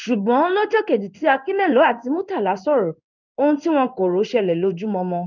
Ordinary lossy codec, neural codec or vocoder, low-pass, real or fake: none; codec, 16 kHz in and 24 kHz out, 1 kbps, XY-Tokenizer; 7.2 kHz; fake